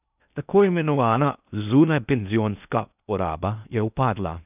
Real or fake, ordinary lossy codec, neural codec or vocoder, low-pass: fake; none; codec, 16 kHz in and 24 kHz out, 0.6 kbps, FocalCodec, streaming, 2048 codes; 3.6 kHz